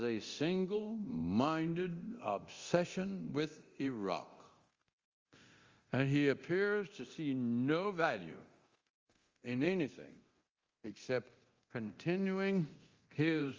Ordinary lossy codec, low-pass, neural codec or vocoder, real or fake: Opus, 32 kbps; 7.2 kHz; codec, 24 kHz, 0.9 kbps, DualCodec; fake